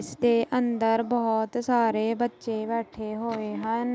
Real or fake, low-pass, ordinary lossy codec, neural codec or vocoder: real; none; none; none